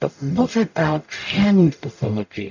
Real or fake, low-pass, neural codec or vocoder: fake; 7.2 kHz; codec, 44.1 kHz, 0.9 kbps, DAC